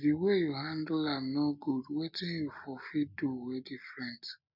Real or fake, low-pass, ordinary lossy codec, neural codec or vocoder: real; 5.4 kHz; none; none